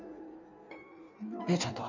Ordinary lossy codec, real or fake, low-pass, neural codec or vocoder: none; fake; 7.2 kHz; codec, 16 kHz in and 24 kHz out, 1.1 kbps, FireRedTTS-2 codec